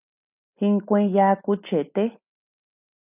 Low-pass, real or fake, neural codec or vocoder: 3.6 kHz; real; none